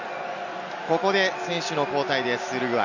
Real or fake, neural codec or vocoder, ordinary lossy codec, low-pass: real; none; none; 7.2 kHz